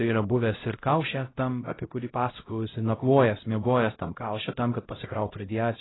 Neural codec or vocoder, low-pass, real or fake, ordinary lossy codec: codec, 16 kHz, 0.5 kbps, X-Codec, HuBERT features, trained on LibriSpeech; 7.2 kHz; fake; AAC, 16 kbps